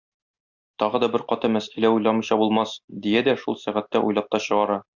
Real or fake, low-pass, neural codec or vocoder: real; 7.2 kHz; none